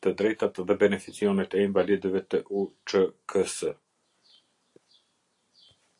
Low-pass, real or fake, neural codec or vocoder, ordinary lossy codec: 10.8 kHz; real; none; AAC, 48 kbps